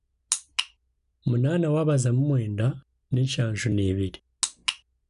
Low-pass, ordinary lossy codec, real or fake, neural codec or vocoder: 10.8 kHz; none; real; none